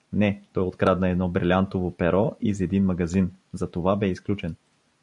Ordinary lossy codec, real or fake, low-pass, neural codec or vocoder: MP3, 64 kbps; real; 10.8 kHz; none